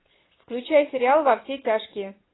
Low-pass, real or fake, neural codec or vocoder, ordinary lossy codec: 7.2 kHz; fake; vocoder, 22.05 kHz, 80 mel bands, Vocos; AAC, 16 kbps